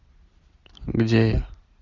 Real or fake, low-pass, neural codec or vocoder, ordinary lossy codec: real; 7.2 kHz; none; Opus, 64 kbps